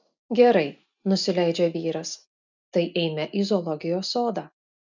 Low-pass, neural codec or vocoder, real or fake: 7.2 kHz; none; real